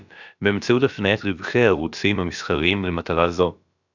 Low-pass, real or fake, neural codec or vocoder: 7.2 kHz; fake; codec, 16 kHz, about 1 kbps, DyCAST, with the encoder's durations